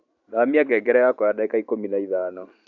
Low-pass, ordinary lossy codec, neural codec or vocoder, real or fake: 7.2 kHz; none; vocoder, 44.1 kHz, 128 mel bands every 512 samples, BigVGAN v2; fake